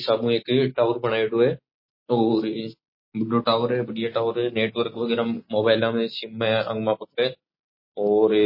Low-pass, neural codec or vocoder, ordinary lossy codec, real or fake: 5.4 kHz; none; MP3, 24 kbps; real